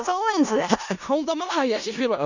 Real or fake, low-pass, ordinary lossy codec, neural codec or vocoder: fake; 7.2 kHz; none; codec, 16 kHz in and 24 kHz out, 0.4 kbps, LongCat-Audio-Codec, four codebook decoder